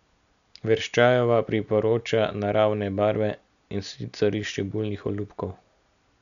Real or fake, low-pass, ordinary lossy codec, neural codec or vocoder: real; 7.2 kHz; none; none